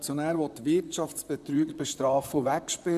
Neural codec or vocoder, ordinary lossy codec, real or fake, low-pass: vocoder, 44.1 kHz, 128 mel bands, Pupu-Vocoder; none; fake; 14.4 kHz